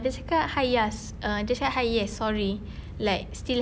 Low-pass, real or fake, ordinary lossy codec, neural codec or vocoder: none; real; none; none